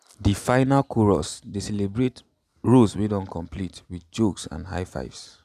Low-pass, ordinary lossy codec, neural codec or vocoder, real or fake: 14.4 kHz; none; none; real